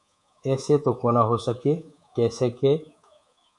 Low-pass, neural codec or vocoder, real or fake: 10.8 kHz; codec, 24 kHz, 3.1 kbps, DualCodec; fake